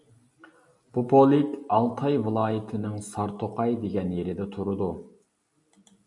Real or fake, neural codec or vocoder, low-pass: real; none; 10.8 kHz